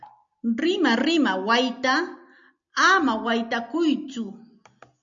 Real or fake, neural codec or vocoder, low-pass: real; none; 7.2 kHz